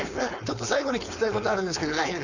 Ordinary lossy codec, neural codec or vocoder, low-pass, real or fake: none; codec, 16 kHz, 4.8 kbps, FACodec; 7.2 kHz; fake